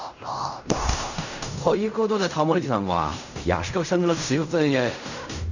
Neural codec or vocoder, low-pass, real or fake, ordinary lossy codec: codec, 16 kHz in and 24 kHz out, 0.4 kbps, LongCat-Audio-Codec, fine tuned four codebook decoder; 7.2 kHz; fake; none